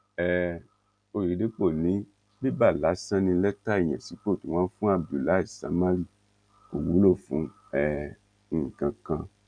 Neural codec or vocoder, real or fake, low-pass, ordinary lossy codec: none; real; 9.9 kHz; none